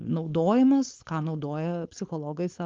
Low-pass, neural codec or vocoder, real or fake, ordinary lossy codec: 7.2 kHz; codec, 16 kHz, 4.8 kbps, FACodec; fake; Opus, 32 kbps